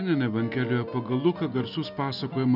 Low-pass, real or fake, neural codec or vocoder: 5.4 kHz; real; none